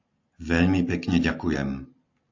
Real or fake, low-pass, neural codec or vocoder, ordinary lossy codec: real; 7.2 kHz; none; AAC, 48 kbps